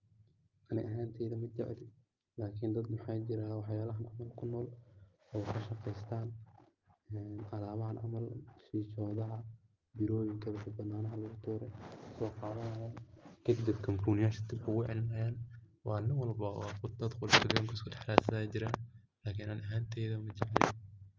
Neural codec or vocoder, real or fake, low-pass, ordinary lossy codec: none; real; 7.2 kHz; Opus, 32 kbps